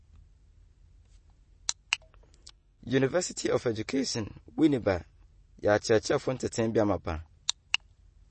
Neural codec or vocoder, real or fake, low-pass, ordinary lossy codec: none; real; 10.8 kHz; MP3, 32 kbps